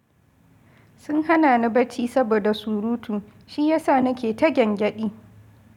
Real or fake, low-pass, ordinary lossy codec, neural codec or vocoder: real; 19.8 kHz; none; none